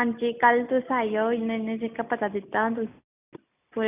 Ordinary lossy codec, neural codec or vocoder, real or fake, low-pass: none; none; real; 3.6 kHz